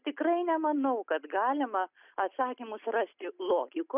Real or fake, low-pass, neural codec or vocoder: real; 3.6 kHz; none